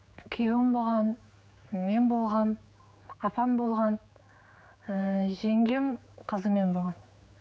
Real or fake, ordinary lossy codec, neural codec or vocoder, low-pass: fake; none; codec, 16 kHz, 4 kbps, X-Codec, HuBERT features, trained on general audio; none